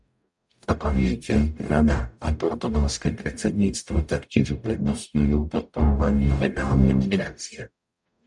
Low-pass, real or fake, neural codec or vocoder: 10.8 kHz; fake; codec, 44.1 kHz, 0.9 kbps, DAC